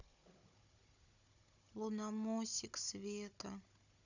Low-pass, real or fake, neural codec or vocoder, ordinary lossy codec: 7.2 kHz; fake; codec, 16 kHz, 16 kbps, FunCodec, trained on Chinese and English, 50 frames a second; none